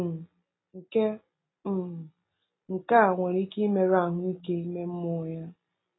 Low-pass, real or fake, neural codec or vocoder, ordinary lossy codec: 7.2 kHz; real; none; AAC, 16 kbps